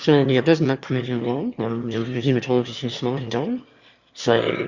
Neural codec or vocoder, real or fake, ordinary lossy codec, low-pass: autoencoder, 22.05 kHz, a latent of 192 numbers a frame, VITS, trained on one speaker; fake; Opus, 64 kbps; 7.2 kHz